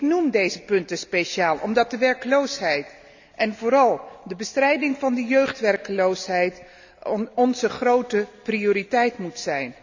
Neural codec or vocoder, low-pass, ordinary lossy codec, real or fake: none; 7.2 kHz; none; real